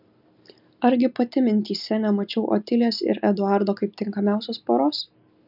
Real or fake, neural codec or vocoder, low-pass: real; none; 5.4 kHz